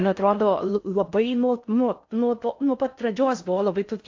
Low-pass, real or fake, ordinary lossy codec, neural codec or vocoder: 7.2 kHz; fake; AAC, 48 kbps; codec, 16 kHz in and 24 kHz out, 0.6 kbps, FocalCodec, streaming, 4096 codes